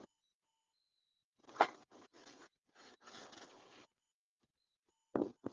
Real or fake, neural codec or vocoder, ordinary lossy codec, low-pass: fake; codec, 16 kHz, 16 kbps, FreqCodec, smaller model; Opus, 32 kbps; 7.2 kHz